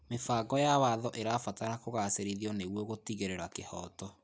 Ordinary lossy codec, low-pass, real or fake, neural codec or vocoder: none; none; real; none